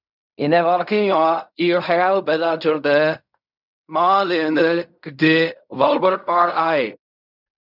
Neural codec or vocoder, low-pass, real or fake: codec, 16 kHz in and 24 kHz out, 0.4 kbps, LongCat-Audio-Codec, fine tuned four codebook decoder; 5.4 kHz; fake